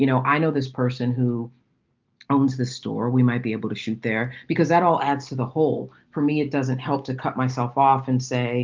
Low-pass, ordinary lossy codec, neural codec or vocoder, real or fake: 7.2 kHz; Opus, 24 kbps; none; real